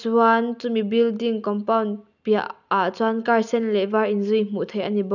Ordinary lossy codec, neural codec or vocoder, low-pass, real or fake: none; none; 7.2 kHz; real